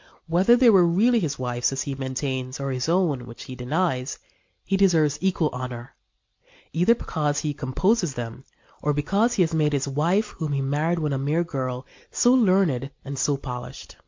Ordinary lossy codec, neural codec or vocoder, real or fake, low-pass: MP3, 48 kbps; none; real; 7.2 kHz